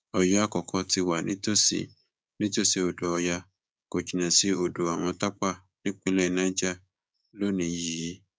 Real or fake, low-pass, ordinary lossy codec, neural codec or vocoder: fake; none; none; codec, 16 kHz, 6 kbps, DAC